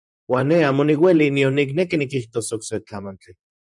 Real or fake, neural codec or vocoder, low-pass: fake; vocoder, 44.1 kHz, 128 mel bands, Pupu-Vocoder; 10.8 kHz